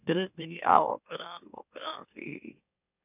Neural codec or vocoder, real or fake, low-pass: autoencoder, 44.1 kHz, a latent of 192 numbers a frame, MeloTTS; fake; 3.6 kHz